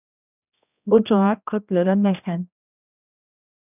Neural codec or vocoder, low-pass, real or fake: codec, 16 kHz, 1 kbps, X-Codec, HuBERT features, trained on general audio; 3.6 kHz; fake